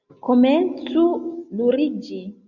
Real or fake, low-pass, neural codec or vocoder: real; 7.2 kHz; none